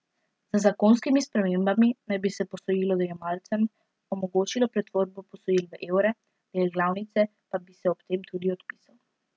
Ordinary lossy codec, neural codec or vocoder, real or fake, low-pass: none; none; real; none